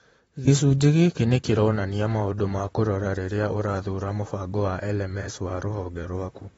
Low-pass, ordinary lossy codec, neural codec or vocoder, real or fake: 19.8 kHz; AAC, 24 kbps; vocoder, 44.1 kHz, 128 mel bands, Pupu-Vocoder; fake